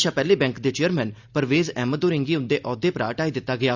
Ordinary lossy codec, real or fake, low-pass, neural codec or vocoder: Opus, 64 kbps; real; 7.2 kHz; none